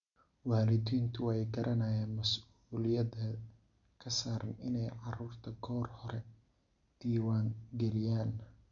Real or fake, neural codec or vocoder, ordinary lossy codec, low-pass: real; none; none; 7.2 kHz